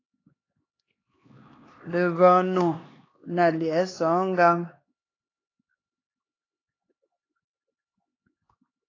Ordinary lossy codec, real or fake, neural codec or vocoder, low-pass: AAC, 32 kbps; fake; codec, 16 kHz, 2 kbps, X-Codec, HuBERT features, trained on LibriSpeech; 7.2 kHz